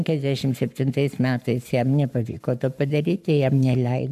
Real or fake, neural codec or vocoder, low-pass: fake; codec, 44.1 kHz, 7.8 kbps, Pupu-Codec; 14.4 kHz